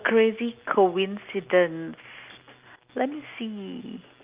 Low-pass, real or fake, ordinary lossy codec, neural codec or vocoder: 3.6 kHz; real; Opus, 32 kbps; none